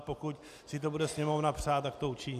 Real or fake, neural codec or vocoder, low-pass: real; none; 14.4 kHz